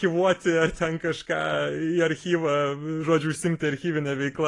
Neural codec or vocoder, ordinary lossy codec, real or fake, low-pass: none; AAC, 32 kbps; real; 10.8 kHz